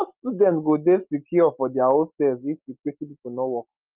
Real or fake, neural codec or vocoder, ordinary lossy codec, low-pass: real; none; Opus, 32 kbps; 3.6 kHz